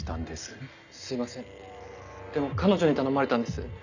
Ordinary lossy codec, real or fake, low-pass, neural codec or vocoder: none; real; 7.2 kHz; none